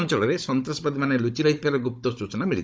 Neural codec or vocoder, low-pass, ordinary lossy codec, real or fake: codec, 16 kHz, 16 kbps, FunCodec, trained on Chinese and English, 50 frames a second; none; none; fake